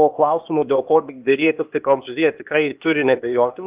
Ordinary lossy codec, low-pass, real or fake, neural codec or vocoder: Opus, 32 kbps; 3.6 kHz; fake; codec, 16 kHz, 0.8 kbps, ZipCodec